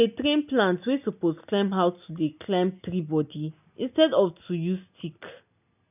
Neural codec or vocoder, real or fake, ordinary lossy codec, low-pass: none; real; none; 3.6 kHz